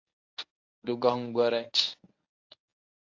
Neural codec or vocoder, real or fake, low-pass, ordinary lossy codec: codec, 24 kHz, 0.9 kbps, WavTokenizer, medium speech release version 1; fake; 7.2 kHz; AAC, 48 kbps